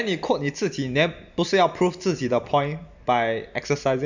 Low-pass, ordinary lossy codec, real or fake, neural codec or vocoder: 7.2 kHz; none; real; none